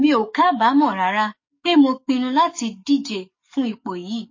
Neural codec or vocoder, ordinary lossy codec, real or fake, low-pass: codec, 44.1 kHz, 7.8 kbps, DAC; MP3, 32 kbps; fake; 7.2 kHz